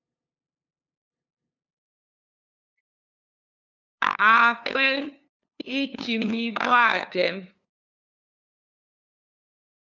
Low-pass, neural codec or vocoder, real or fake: 7.2 kHz; codec, 16 kHz, 2 kbps, FunCodec, trained on LibriTTS, 25 frames a second; fake